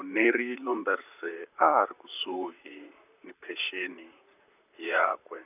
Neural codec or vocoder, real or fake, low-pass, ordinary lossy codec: vocoder, 44.1 kHz, 128 mel bands, Pupu-Vocoder; fake; 3.6 kHz; none